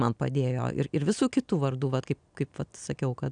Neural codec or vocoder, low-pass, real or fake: none; 9.9 kHz; real